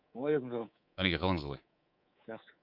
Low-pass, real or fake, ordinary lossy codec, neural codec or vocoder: 5.4 kHz; fake; Opus, 64 kbps; codec, 24 kHz, 3.1 kbps, DualCodec